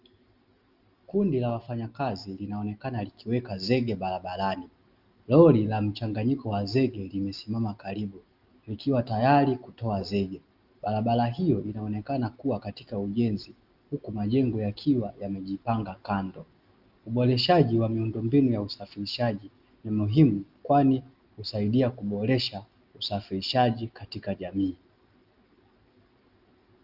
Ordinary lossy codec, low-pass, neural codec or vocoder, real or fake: Opus, 24 kbps; 5.4 kHz; none; real